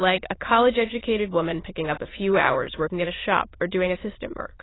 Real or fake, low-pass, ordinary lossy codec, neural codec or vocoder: fake; 7.2 kHz; AAC, 16 kbps; autoencoder, 22.05 kHz, a latent of 192 numbers a frame, VITS, trained on many speakers